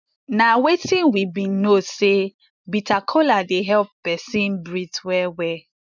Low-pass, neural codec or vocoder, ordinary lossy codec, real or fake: 7.2 kHz; none; none; real